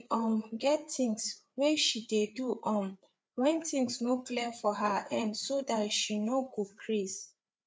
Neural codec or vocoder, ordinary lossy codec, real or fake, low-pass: codec, 16 kHz, 4 kbps, FreqCodec, larger model; none; fake; none